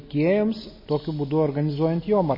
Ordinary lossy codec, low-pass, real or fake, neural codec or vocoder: MP3, 24 kbps; 5.4 kHz; real; none